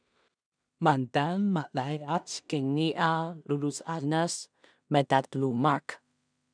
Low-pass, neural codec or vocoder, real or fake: 9.9 kHz; codec, 16 kHz in and 24 kHz out, 0.4 kbps, LongCat-Audio-Codec, two codebook decoder; fake